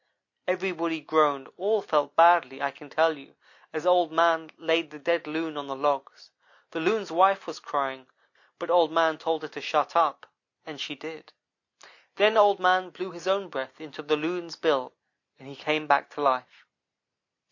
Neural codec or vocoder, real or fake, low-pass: none; real; 7.2 kHz